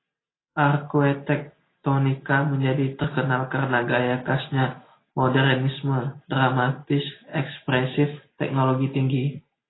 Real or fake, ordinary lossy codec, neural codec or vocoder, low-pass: real; AAC, 16 kbps; none; 7.2 kHz